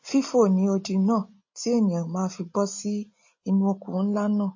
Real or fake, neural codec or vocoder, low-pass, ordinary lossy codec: real; none; 7.2 kHz; MP3, 32 kbps